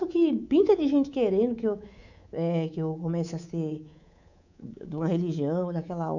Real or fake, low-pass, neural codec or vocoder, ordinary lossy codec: fake; 7.2 kHz; codec, 24 kHz, 3.1 kbps, DualCodec; none